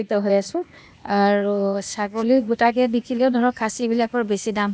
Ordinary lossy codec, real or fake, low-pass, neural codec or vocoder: none; fake; none; codec, 16 kHz, 0.8 kbps, ZipCodec